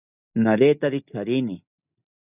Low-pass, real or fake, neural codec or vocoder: 3.6 kHz; real; none